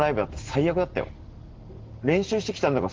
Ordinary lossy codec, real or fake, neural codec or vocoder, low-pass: Opus, 16 kbps; real; none; 7.2 kHz